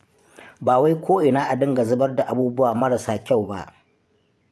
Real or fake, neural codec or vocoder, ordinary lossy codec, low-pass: fake; vocoder, 24 kHz, 100 mel bands, Vocos; none; none